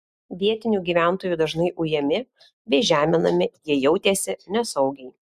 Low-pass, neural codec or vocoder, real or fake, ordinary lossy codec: 14.4 kHz; none; real; Opus, 64 kbps